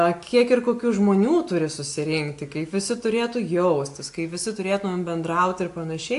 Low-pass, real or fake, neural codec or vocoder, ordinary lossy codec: 10.8 kHz; real; none; AAC, 64 kbps